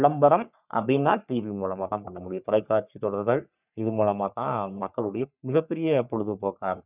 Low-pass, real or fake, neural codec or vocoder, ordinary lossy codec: 3.6 kHz; fake; codec, 44.1 kHz, 3.4 kbps, Pupu-Codec; none